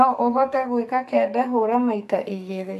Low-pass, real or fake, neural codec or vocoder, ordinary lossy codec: 14.4 kHz; fake; codec, 44.1 kHz, 2.6 kbps, SNAC; AAC, 96 kbps